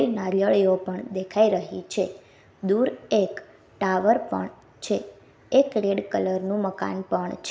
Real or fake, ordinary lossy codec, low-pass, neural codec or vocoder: real; none; none; none